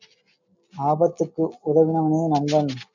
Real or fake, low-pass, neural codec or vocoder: real; 7.2 kHz; none